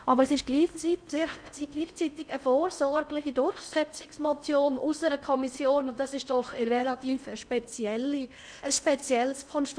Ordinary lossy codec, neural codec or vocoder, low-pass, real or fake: none; codec, 16 kHz in and 24 kHz out, 0.6 kbps, FocalCodec, streaming, 4096 codes; 9.9 kHz; fake